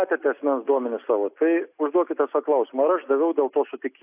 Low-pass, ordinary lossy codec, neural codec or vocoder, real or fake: 3.6 kHz; AAC, 32 kbps; none; real